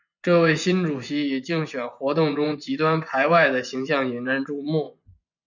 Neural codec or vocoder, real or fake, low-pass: vocoder, 24 kHz, 100 mel bands, Vocos; fake; 7.2 kHz